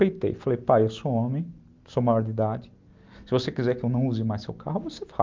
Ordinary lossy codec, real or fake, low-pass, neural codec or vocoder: Opus, 24 kbps; real; 7.2 kHz; none